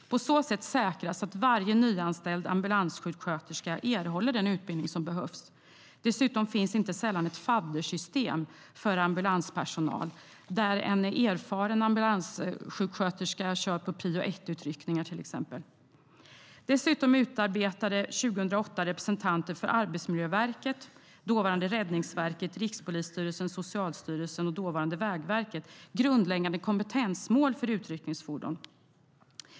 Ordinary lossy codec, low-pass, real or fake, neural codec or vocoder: none; none; real; none